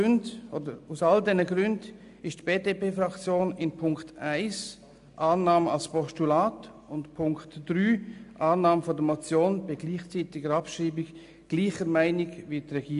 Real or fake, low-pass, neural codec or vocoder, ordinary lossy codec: real; 10.8 kHz; none; AAC, 96 kbps